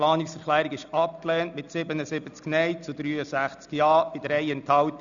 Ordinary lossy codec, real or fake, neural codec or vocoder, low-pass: none; real; none; 7.2 kHz